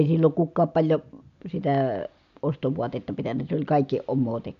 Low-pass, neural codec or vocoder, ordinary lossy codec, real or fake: 7.2 kHz; none; AAC, 96 kbps; real